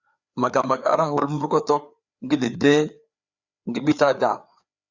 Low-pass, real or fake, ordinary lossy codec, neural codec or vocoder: 7.2 kHz; fake; Opus, 64 kbps; codec, 16 kHz, 4 kbps, FreqCodec, larger model